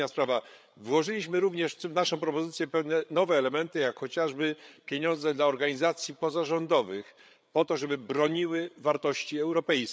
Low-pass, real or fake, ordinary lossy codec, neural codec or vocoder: none; fake; none; codec, 16 kHz, 8 kbps, FreqCodec, larger model